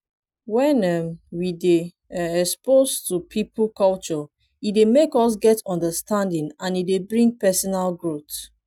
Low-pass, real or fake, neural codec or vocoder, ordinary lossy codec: 19.8 kHz; real; none; none